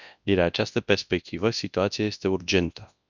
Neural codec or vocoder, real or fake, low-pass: codec, 24 kHz, 0.9 kbps, WavTokenizer, large speech release; fake; 7.2 kHz